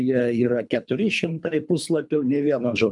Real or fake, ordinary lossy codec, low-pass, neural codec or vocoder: fake; MP3, 96 kbps; 10.8 kHz; codec, 24 kHz, 3 kbps, HILCodec